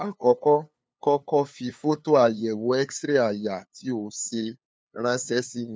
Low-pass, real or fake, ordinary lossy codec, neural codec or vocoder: none; fake; none; codec, 16 kHz, 2 kbps, FunCodec, trained on LibriTTS, 25 frames a second